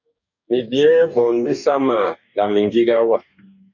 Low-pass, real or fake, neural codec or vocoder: 7.2 kHz; fake; codec, 44.1 kHz, 2.6 kbps, DAC